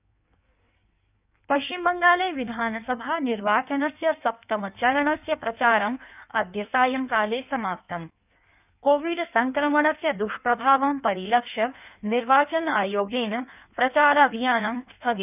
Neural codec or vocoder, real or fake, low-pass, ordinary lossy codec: codec, 16 kHz in and 24 kHz out, 1.1 kbps, FireRedTTS-2 codec; fake; 3.6 kHz; none